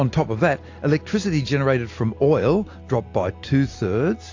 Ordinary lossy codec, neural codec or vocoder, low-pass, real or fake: AAC, 48 kbps; none; 7.2 kHz; real